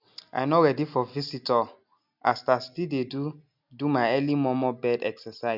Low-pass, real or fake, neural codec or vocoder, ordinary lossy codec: 5.4 kHz; real; none; none